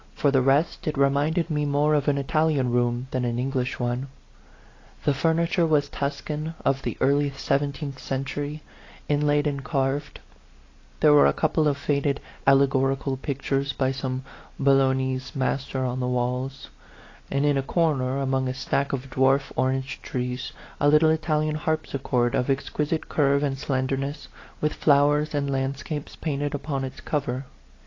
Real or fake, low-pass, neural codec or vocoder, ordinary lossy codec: real; 7.2 kHz; none; AAC, 32 kbps